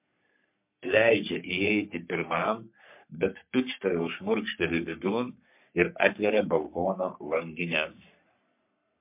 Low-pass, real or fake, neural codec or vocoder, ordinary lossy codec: 3.6 kHz; fake; codec, 44.1 kHz, 3.4 kbps, Pupu-Codec; MP3, 32 kbps